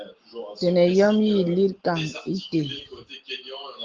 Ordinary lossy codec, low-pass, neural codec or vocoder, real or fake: Opus, 16 kbps; 7.2 kHz; none; real